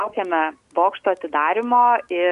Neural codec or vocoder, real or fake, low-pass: none; real; 10.8 kHz